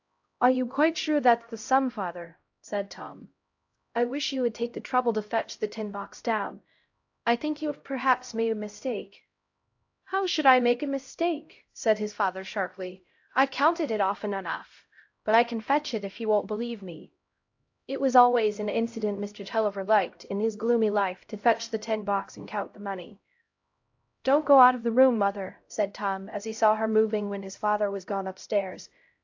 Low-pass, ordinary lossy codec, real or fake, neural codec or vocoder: 7.2 kHz; AAC, 48 kbps; fake; codec, 16 kHz, 0.5 kbps, X-Codec, HuBERT features, trained on LibriSpeech